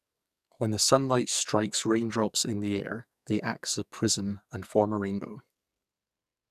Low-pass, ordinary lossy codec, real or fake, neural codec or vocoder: 14.4 kHz; none; fake; codec, 44.1 kHz, 2.6 kbps, SNAC